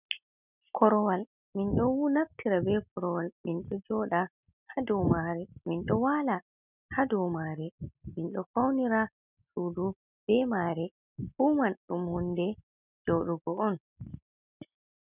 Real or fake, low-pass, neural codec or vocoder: real; 3.6 kHz; none